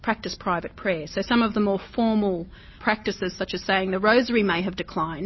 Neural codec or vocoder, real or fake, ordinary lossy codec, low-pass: none; real; MP3, 24 kbps; 7.2 kHz